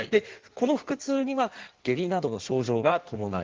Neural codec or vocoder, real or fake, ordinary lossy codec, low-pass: codec, 16 kHz in and 24 kHz out, 1.1 kbps, FireRedTTS-2 codec; fake; Opus, 16 kbps; 7.2 kHz